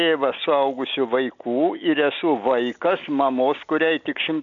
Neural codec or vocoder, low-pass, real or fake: none; 7.2 kHz; real